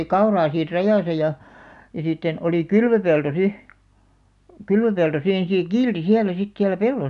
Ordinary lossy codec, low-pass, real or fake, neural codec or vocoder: none; 10.8 kHz; real; none